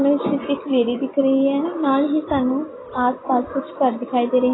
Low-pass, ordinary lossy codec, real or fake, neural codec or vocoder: 7.2 kHz; AAC, 16 kbps; real; none